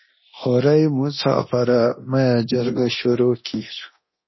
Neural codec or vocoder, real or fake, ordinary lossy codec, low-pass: codec, 24 kHz, 0.9 kbps, DualCodec; fake; MP3, 24 kbps; 7.2 kHz